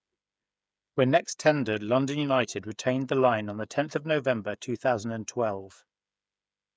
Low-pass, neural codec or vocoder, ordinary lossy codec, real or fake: none; codec, 16 kHz, 8 kbps, FreqCodec, smaller model; none; fake